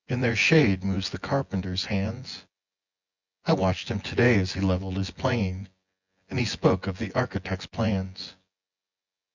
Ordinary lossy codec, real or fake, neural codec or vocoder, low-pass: Opus, 64 kbps; fake; vocoder, 24 kHz, 100 mel bands, Vocos; 7.2 kHz